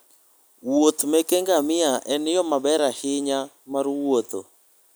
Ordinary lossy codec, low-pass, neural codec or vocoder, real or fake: none; none; none; real